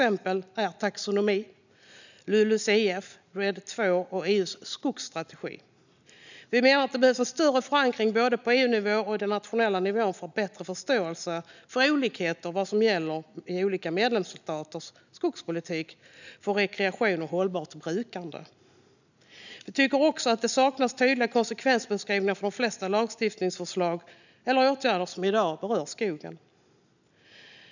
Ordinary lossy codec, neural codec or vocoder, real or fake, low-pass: none; none; real; 7.2 kHz